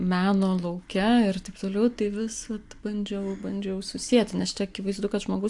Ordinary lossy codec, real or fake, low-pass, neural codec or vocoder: AAC, 64 kbps; real; 10.8 kHz; none